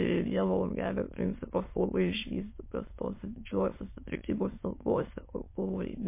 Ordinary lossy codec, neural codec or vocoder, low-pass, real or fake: MP3, 24 kbps; autoencoder, 22.05 kHz, a latent of 192 numbers a frame, VITS, trained on many speakers; 3.6 kHz; fake